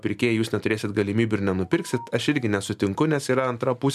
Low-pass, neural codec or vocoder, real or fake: 14.4 kHz; none; real